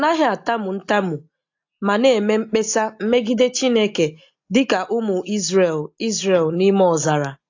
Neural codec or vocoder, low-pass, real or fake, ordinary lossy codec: none; 7.2 kHz; real; AAC, 48 kbps